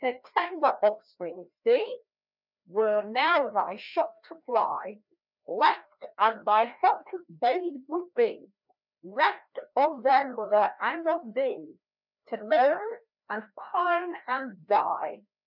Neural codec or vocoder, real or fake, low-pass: codec, 16 kHz, 1 kbps, FreqCodec, larger model; fake; 5.4 kHz